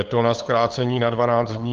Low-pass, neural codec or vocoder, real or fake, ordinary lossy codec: 7.2 kHz; codec, 16 kHz, 8 kbps, FunCodec, trained on LibriTTS, 25 frames a second; fake; Opus, 16 kbps